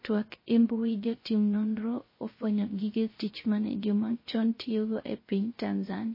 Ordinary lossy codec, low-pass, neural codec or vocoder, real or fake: MP3, 24 kbps; 5.4 kHz; codec, 16 kHz, 0.3 kbps, FocalCodec; fake